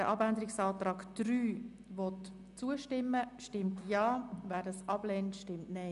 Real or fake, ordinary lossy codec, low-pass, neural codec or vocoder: real; none; 10.8 kHz; none